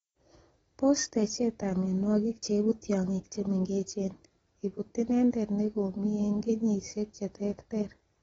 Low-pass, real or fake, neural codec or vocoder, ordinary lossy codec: 19.8 kHz; real; none; AAC, 24 kbps